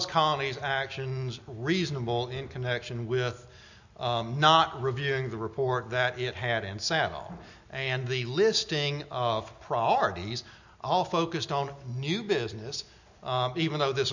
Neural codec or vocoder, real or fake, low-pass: none; real; 7.2 kHz